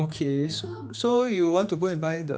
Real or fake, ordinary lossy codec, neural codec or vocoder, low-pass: fake; none; codec, 16 kHz, 2 kbps, X-Codec, HuBERT features, trained on general audio; none